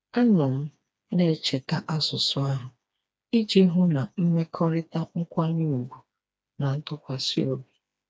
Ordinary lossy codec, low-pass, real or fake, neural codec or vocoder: none; none; fake; codec, 16 kHz, 2 kbps, FreqCodec, smaller model